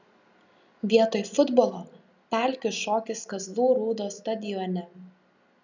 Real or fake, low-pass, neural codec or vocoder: real; 7.2 kHz; none